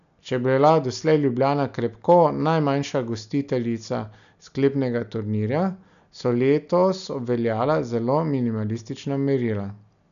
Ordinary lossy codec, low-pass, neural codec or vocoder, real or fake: none; 7.2 kHz; none; real